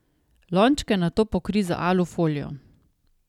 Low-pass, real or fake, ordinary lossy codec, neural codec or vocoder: 19.8 kHz; real; none; none